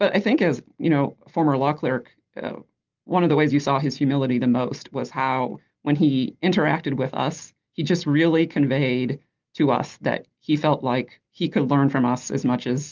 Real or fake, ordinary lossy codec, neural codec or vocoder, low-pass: real; Opus, 32 kbps; none; 7.2 kHz